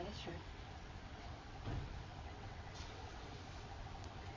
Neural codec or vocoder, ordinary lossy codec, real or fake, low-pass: vocoder, 22.05 kHz, 80 mel bands, WaveNeXt; MP3, 32 kbps; fake; 7.2 kHz